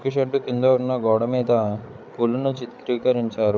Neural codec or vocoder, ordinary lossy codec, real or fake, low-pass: codec, 16 kHz, 8 kbps, FreqCodec, larger model; none; fake; none